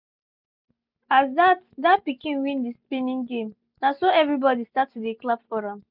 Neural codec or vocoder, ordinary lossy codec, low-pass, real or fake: none; none; 5.4 kHz; real